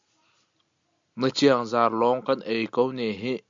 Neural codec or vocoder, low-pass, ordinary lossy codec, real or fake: none; 7.2 kHz; MP3, 64 kbps; real